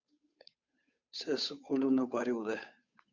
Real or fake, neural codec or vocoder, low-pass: fake; codec, 16 kHz, 8 kbps, FunCodec, trained on Chinese and English, 25 frames a second; 7.2 kHz